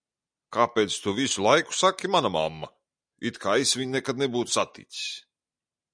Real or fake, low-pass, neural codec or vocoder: real; 9.9 kHz; none